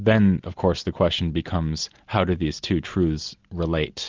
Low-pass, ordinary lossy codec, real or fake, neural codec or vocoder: 7.2 kHz; Opus, 24 kbps; real; none